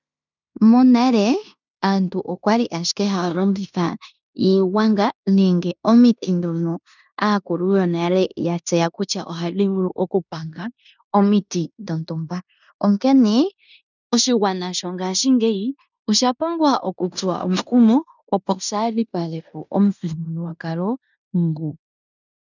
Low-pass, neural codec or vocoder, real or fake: 7.2 kHz; codec, 16 kHz in and 24 kHz out, 0.9 kbps, LongCat-Audio-Codec, fine tuned four codebook decoder; fake